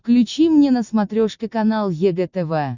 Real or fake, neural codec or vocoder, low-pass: real; none; 7.2 kHz